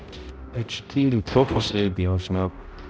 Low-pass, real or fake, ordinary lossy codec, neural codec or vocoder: none; fake; none; codec, 16 kHz, 0.5 kbps, X-Codec, HuBERT features, trained on balanced general audio